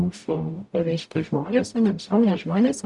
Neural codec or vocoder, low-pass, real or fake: codec, 44.1 kHz, 0.9 kbps, DAC; 10.8 kHz; fake